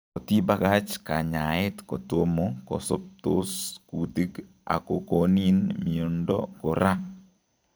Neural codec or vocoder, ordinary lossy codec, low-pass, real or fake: vocoder, 44.1 kHz, 128 mel bands every 256 samples, BigVGAN v2; none; none; fake